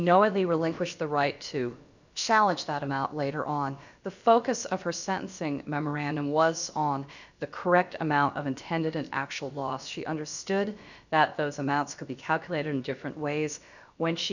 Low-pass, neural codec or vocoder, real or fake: 7.2 kHz; codec, 16 kHz, about 1 kbps, DyCAST, with the encoder's durations; fake